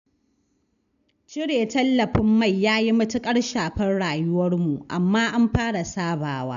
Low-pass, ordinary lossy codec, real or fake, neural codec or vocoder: 7.2 kHz; none; real; none